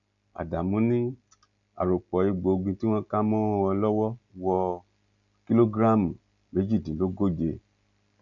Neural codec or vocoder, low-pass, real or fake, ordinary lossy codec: none; 7.2 kHz; real; none